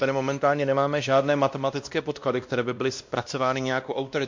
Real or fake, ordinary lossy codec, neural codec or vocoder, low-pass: fake; MP3, 48 kbps; codec, 16 kHz, 1 kbps, X-Codec, WavLM features, trained on Multilingual LibriSpeech; 7.2 kHz